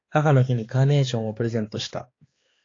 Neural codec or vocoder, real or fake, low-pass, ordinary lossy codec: codec, 16 kHz, 2 kbps, X-Codec, HuBERT features, trained on balanced general audio; fake; 7.2 kHz; AAC, 32 kbps